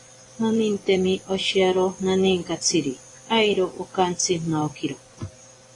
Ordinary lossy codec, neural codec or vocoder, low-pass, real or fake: AAC, 32 kbps; vocoder, 24 kHz, 100 mel bands, Vocos; 10.8 kHz; fake